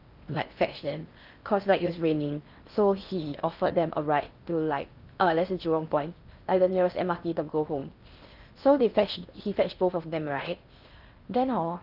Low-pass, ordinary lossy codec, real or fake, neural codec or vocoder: 5.4 kHz; Opus, 24 kbps; fake; codec, 16 kHz in and 24 kHz out, 0.6 kbps, FocalCodec, streaming, 4096 codes